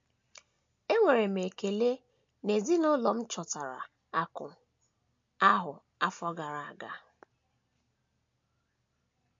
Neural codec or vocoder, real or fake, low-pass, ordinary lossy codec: none; real; 7.2 kHz; MP3, 48 kbps